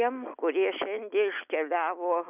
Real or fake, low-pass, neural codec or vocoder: real; 3.6 kHz; none